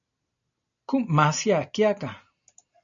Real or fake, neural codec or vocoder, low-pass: real; none; 7.2 kHz